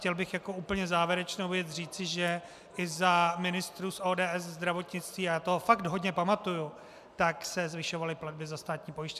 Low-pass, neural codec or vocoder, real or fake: 14.4 kHz; none; real